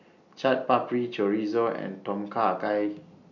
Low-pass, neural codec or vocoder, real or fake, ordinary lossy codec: 7.2 kHz; none; real; none